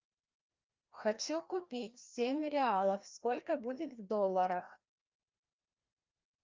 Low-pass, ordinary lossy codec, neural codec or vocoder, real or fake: 7.2 kHz; Opus, 24 kbps; codec, 16 kHz, 1 kbps, FreqCodec, larger model; fake